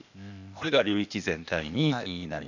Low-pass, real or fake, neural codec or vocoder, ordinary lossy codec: 7.2 kHz; fake; codec, 16 kHz, 0.8 kbps, ZipCodec; none